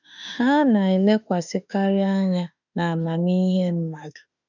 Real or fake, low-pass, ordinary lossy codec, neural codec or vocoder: fake; 7.2 kHz; none; autoencoder, 48 kHz, 32 numbers a frame, DAC-VAE, trained on Japanese speech